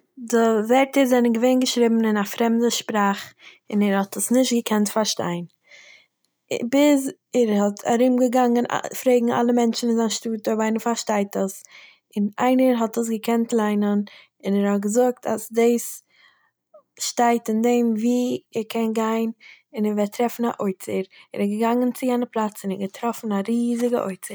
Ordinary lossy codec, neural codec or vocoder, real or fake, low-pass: none; none; real; none